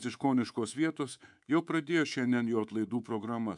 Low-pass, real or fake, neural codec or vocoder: 10.8 kHz; fake; codec, 24 kHz, 3.1 kbps, DualCodec